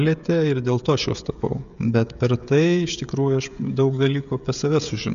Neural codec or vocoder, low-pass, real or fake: codec, 16 kHz, 16 kbps, FreqCodec, smaller model; 7.2 kHz; fake